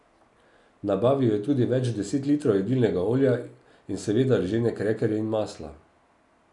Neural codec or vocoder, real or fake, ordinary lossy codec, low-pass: none; real; none; 10.8 kHz